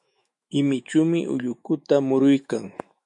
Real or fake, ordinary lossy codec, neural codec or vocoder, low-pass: fake; MP3, 48 kbps; autoencoder, 48 kHz, 128 numbers a frame, DAC-VAE, trained on Japanese speech; 10.8 kHz